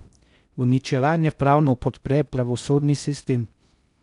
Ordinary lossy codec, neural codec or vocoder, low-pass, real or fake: none; codec, 16 kHz in and 24 kHz out, 0.6 kbps, FocalCodec, streaming, 4096 codes; 10.8 kHz; fake